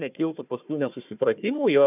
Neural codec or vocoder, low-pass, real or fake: codec, 16 kHz, 1 kbps, FunCodec, trained on Chinese and English, 50 frames a second; 3.6 kHz; fake